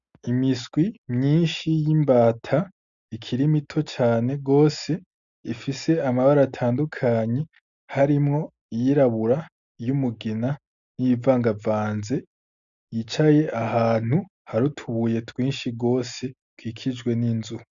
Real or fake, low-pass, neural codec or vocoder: real; 7.2 kHz; none